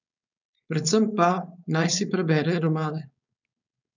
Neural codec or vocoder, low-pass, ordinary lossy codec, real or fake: codec, 16 kHz, 4.8 kbps, FACodec; 7.2 kHz; none; fake